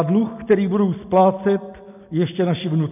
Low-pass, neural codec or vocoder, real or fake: 3.6 kHz; none; real